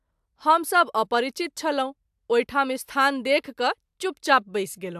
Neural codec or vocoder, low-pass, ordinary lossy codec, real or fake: none; 14.4 kHz; AAC, 96 kbps; real